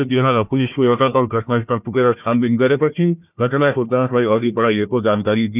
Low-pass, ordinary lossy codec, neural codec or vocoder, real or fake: 3.6 kHz; none; codec, 16 kHz, 1 kbps, FunCodec, trained on Chinese and English, 50 frames a second; fake